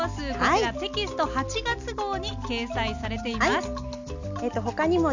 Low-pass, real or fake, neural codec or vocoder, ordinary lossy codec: 7.2 kHz; real; none; none